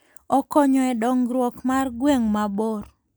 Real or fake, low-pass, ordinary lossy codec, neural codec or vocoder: real; none; none; none